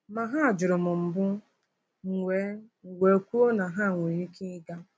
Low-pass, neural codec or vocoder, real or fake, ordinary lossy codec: none; none; real; none